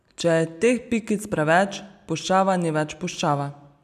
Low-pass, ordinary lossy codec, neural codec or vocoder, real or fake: 14.4 kHz; none; none; real